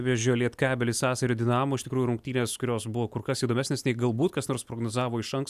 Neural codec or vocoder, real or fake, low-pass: none; real; 14.4 kHz